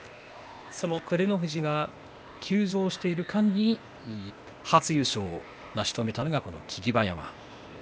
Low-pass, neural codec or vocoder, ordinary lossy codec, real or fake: none; codec, 16 kHz, 0.8 kbps, ZipCodec; none; fake